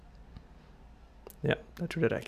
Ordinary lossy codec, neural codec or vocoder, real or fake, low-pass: none; none; real; 14.4 kHz